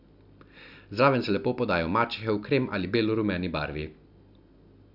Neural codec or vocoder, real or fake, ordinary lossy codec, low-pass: none; real; none; 5.4 kHz